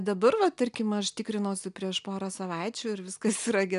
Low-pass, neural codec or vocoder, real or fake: 10.8 kHz; none; real